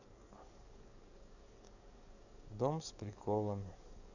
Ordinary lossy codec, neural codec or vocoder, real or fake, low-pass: none; codec, 44.1 kHz, 7.8 kbps, DAC; fake; 7.2 kHz